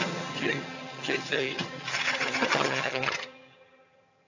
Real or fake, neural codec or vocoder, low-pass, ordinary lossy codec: fake; vocoder, 22.05 kHz, 80 mel bands, HiFi-GAN; 7.2 kHz; AAC, 48 kbps